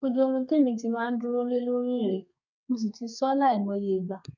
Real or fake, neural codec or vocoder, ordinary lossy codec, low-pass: fake; codec, 32 kHz, 1.9 kbps, SNAC; none; 7.2 kHz